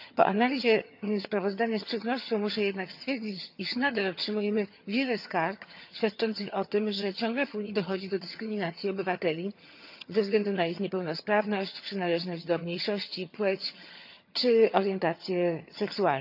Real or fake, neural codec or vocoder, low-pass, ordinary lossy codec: fake; vocoder, 22.05 kHz, 80 mel bands, HiFi-GAN; 5.4 kHz; none